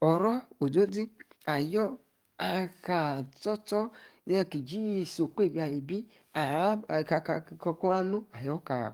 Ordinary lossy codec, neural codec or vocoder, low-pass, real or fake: Opus, 32 kbps; codec, 44.1 kHz, 7.8 kbps, DAC; 19.8 kHz; fake